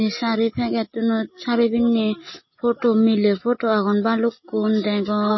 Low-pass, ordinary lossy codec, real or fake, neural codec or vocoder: 7.2 kHz; MP3, 24 kbps; real; none